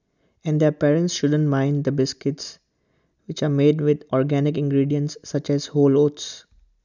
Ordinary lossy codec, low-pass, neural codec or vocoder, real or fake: none; 7.2 kHz; none; real